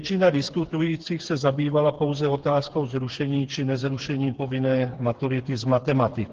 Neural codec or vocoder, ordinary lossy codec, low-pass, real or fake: codec, 16 kHz, 4 kbps, FreqCodec, smaller model; Opus, 16 kbps; 7.2 kHz; fake